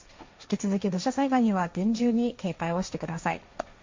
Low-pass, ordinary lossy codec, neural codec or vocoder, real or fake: 7.2 kHz; MP3, 48 kbps; codec, 16 kHz, 1.1 kbps, Voila-Tokenizer; fake